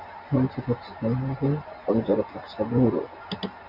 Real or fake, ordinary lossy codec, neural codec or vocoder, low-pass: real; AAC, 48 kbps; none; 5.4 kHz